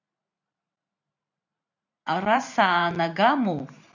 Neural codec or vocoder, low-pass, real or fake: vocoder, 44.1 kHz, 128 mel bands every 512 samples, BigVGAN v2; 7.2 kHz; fake